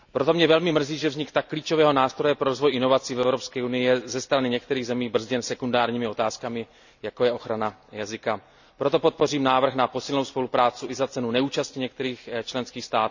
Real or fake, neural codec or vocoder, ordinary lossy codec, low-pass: real; none; none; 7.2 kHz